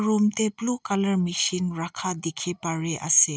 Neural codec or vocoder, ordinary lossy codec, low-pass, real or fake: none; none; none; real